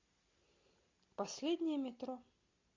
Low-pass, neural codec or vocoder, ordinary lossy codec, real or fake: 7.2 kHz; none; MP3, 64 kbps; real